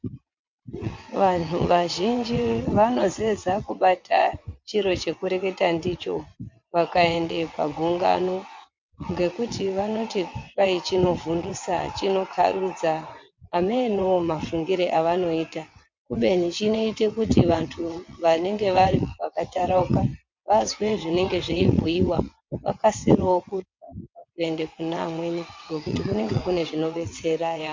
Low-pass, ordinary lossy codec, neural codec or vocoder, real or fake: 7.2 kHz; MP3, 48 kbps; vocoder, 22.05 kHz, 80 mel bands, WaveNeXt; fake